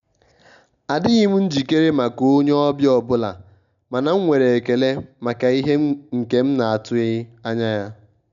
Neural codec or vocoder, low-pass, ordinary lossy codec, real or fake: none; 7.2 kHz; none; real